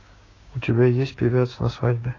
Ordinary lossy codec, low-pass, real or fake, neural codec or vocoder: AAC, 32 kbps; 7.2 kHz; fake; codec, 16 kHz, 6 kbps, DAC